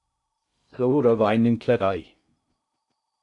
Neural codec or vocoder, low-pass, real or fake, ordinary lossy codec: codec, 16 kHz in and 24 kHz out, 0.6 kbps, FocalCodec, streaming, 2048 codes; 10.8 kHz; fake; MP3, 96 kbps